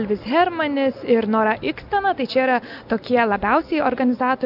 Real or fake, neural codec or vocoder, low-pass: real; none; 5.4 kHz